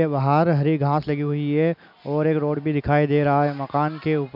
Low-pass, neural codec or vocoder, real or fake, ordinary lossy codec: 5.4 kHz; none; real; none